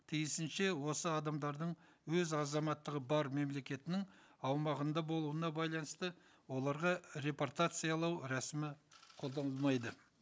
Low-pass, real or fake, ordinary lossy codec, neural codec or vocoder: none; real; none; none